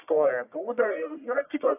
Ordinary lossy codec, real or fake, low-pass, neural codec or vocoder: AAC, 32 kbps; fake; 3.6 kHz; codec, 44.1 kHz, 1.7 kbps, Pupu-Codec